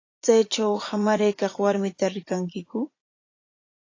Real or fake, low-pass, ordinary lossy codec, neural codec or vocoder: fake; 7.2 kHz; AAC, 32 kbps; vocoder, 44.1 kHz, 80 mel bands, Vocos